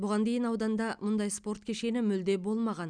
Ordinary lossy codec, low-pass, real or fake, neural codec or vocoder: none; 9.9 kHz; real; none